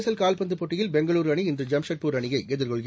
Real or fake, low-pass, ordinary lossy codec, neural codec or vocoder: real; none; none; none